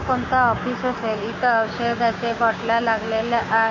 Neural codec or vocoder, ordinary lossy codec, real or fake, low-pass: codec, 16 kHz, 6 kbps, DAC; MP3, 32 kbps; fake; 7.2 kHz